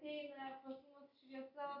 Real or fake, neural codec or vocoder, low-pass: real; none; 5.4 kHz